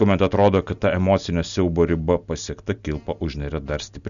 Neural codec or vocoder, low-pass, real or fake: none; 7.2 kHz; real